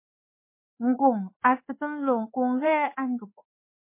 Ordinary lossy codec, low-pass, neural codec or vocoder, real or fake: MP3, 24 kbps; 3.6 kHz; codec, 16 kHz in and 24 kHz out, 1 kbps, XY-Tokenizer; fake